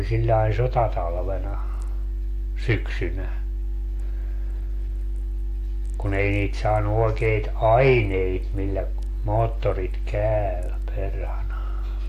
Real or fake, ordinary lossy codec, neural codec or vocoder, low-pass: real; AAC, 64 kbps; none; 14.4 kHz